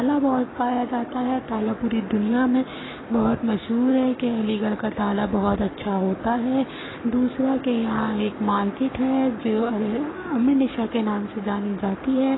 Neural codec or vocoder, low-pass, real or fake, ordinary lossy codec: codec, 44.1 kHz, 7.8 kbps, Pupu-Codec; 7.2 kHz; fake; AAC, 16 kbps